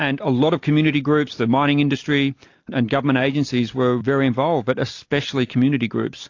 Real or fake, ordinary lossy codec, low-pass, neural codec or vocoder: real; AAC, 48 kbps; 7.2 kHz; none